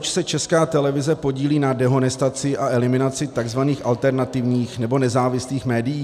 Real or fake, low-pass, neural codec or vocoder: real; 14.4 kHz; none